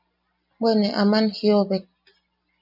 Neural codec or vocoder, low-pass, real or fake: none; 5.4 kHz; real